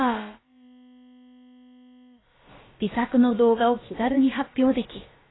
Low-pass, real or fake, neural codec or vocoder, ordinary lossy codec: 7.2 kHz; fake; codec, 16 kHz, about 1 kbps, DyCAST, with the encoder's durations; AAC, 16 kbps